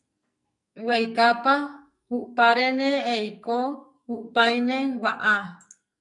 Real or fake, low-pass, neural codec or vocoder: fake; 10.8 kHz; codec, 44.1 kHz, 2.6 kbps, SNAC